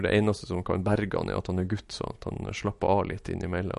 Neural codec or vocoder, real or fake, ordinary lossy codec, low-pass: none; real; MP3, 48 kbps; 14.4 kHz